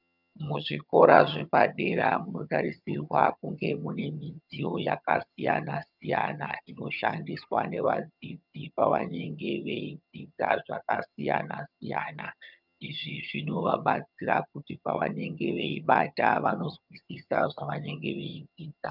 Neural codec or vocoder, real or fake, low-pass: vocoder, 22.05 kHz, 80 mel bands, HiFi-GAN; fake; 5.4 kHz